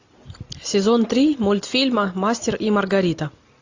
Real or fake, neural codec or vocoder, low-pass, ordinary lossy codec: real; none; 7.2 kHz; AAC, 48 kbps